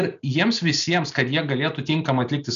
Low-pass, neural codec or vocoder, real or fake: 7.2 kHz; none; real